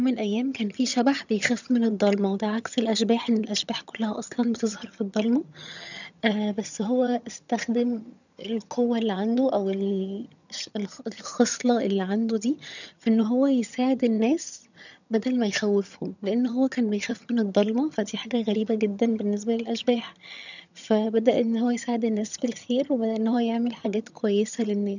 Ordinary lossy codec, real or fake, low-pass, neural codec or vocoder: none; fake; 7.2 kHz; vocoder, 22.05 kHz, 80 mel bands, HiFi-GAN